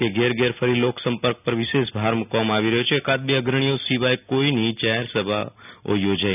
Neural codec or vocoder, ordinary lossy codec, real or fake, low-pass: none; none; real; 3.6 kHz